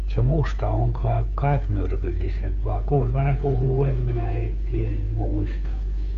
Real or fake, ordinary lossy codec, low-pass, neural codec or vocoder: fake; AAC, 64 kbps; 7.2 kHz; codec, 16 kHz, 2 kbps, FunCodec, trained on Chinese and English, 25 frames a second